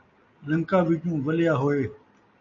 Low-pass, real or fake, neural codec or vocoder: 7.2 kHz; real; none